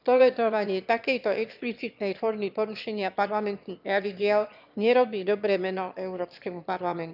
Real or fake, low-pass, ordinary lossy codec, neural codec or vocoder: fake; 5.4 kHz; none; autoencoder, 22.05 kHz, a latent of 192 numbers a frame, VITS, trained on one speaker